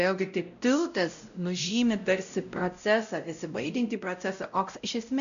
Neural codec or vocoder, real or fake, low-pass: codec, 16 kHz, 0.5 kbps, X-Codec, WavLM features, trained on Multilingual LibriSpeech; fake; 7.2 kHz